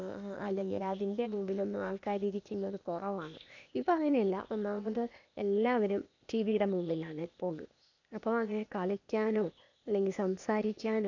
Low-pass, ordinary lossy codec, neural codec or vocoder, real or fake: 7.2 kHz; none; codec, 16 kHz, 0.8 kbps, ZipCodec; fake